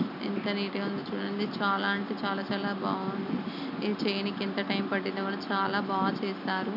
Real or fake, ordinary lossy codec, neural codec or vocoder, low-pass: real; none; none; 5.4 kHz